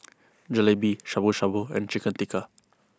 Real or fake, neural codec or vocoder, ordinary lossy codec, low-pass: real; none; none; none